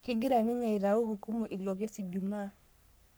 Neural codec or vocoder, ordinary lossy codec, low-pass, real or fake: codec, 44.1 kHz, 3.4 kbps, Pupu-Codec; none; none; fake